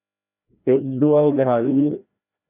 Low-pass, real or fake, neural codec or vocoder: 3.6 kHz; fake; codec, 16 kHz, 1 kbps, FreqCodec, larger model